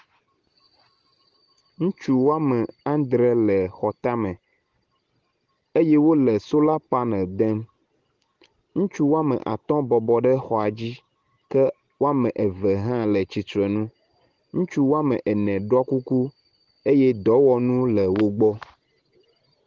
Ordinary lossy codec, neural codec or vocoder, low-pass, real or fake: Opus, 16 kbps; none; 7.2 kHz; real